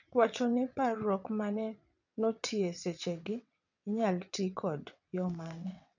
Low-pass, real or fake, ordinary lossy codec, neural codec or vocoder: 7.2 kHz; fake; none; vocoder, 22.05 kHz, 80 mel bands, WaveNeXt